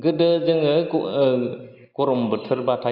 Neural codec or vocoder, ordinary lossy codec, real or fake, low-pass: none; Opus, 64 kbps; real; 5.4 kHz